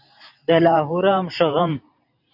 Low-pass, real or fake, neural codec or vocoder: 5.4 kHz; fake; vocoder, 44.1 kHz, 128 mel bands every 512 samples, BigVGAN v2